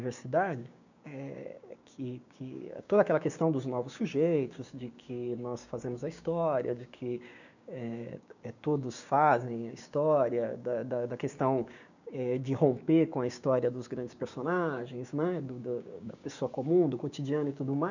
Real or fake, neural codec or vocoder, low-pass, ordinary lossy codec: fake; codec, 16 kHz, 6 kbps, DAC; 7.2 kHz; none